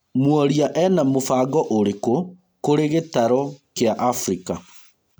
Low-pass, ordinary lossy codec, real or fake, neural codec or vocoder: none; none; real; none